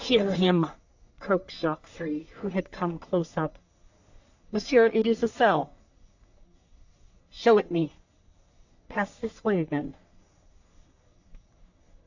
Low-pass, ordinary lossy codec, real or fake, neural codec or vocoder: 7.2 kHz; AAC, 48 kbps; fake; codec, 44.1 kHz, 3.4 kbps, Pupu-Codec